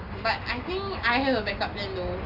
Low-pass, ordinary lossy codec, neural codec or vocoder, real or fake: 5.4 kHz; AAC, 48 kbps; none; real